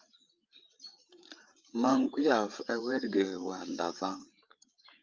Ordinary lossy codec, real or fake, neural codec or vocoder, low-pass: Opus, 24 kbps; fake; codec, 16 kHz, 8 kbps, FreqCodec, larger model; 7.2 kHz